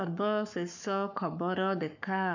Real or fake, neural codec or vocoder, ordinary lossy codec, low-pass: fake; codec, 44.1 kHz, 7.8 kbps, Pupu-Codec; none; 7.2 kHz